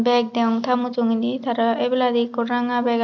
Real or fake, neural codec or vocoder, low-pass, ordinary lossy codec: real; none; 7.2 kHz; none